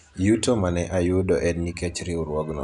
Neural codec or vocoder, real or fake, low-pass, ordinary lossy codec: none; real; 10.8 kHz; none